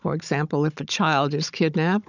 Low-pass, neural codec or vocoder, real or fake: 7.2 kHz; codec, 16 kHz, 16 kbps, FunCodec, trained on Chinese and English, 50 frames a second; fake